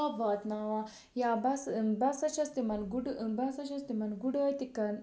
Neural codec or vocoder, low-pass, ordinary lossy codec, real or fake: none; none; none; real